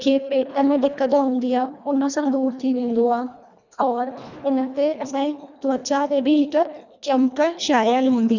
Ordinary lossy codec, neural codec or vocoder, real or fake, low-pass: none; codec, 24 kHz, 1.5 kbps, HILCodec; fake; 7.2 kHz